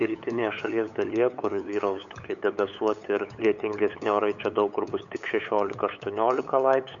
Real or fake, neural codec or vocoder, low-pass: fake; codec, 16 kHz, 8 kbps, FunCodec, trained on LibriTTS, 25 frames a second; 7.2 kHz